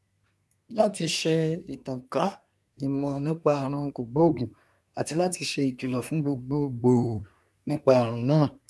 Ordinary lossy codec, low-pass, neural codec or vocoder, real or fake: none; none; codec, 24 kHz, 1 kbps, SNAC; fake